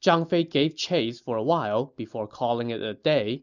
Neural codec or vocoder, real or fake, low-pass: none; real; 7.2 kHz